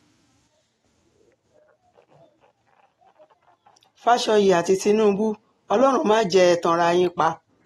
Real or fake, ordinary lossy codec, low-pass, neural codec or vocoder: real; AAC, 32 kbps; 19.8 kHz; none